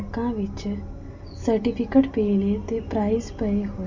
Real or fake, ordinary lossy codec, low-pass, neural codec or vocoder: real; none; 7.2 kHz; none